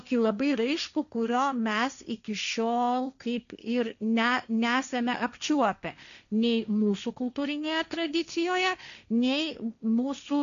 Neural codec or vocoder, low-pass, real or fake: codec, 16 kHz, 1.1 kbps, Voila-Tokenizer; 7.2 kHz; fake